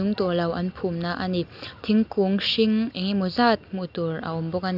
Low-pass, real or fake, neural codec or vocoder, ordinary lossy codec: 5.4 kHz; real; none; none